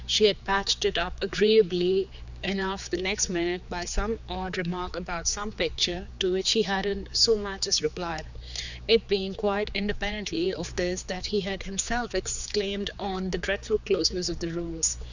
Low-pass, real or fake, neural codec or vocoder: 7.2 kHz; fake; codec, 16 kHz, 4 kbps, X-Codec, HuBERT features, trained on general audio